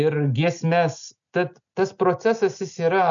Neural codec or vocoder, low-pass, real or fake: none; 7.2 kHz; real